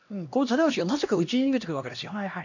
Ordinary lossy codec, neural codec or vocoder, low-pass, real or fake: none; codec, 16 kHz, 1 kbps, X-Codec, HuBERT features, trained on LibriSpeech; 7.2 kHz; fake